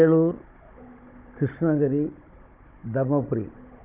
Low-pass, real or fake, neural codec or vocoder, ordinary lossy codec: 3.6 kHz; fake; codec, 16 kHz, 16 kbps, FunCodec, trained on Chinese and English, 50 frames a second; Opus, 32 kbps